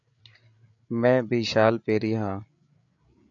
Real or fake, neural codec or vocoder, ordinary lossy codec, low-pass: fake; codec, 16 kHz, 8 kbps, FreqCodec, larger model; AAC, 64 kbps; 7.2 kHz